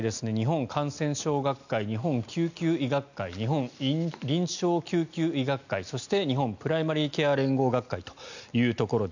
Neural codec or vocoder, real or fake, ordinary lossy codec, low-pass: none; real; none; 7.2 kHz